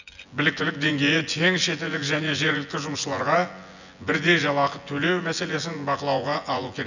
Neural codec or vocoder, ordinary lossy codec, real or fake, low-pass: vocoder, 24 kHz, 100 mel bands, Vocos; none; fake; 7.2 kHz